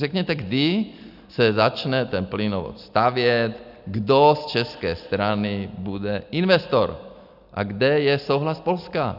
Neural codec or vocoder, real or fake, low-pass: none; real; 5.4 kHz